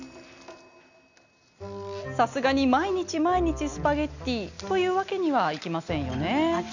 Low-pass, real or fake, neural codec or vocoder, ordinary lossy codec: 7.2 kHz; real; none; none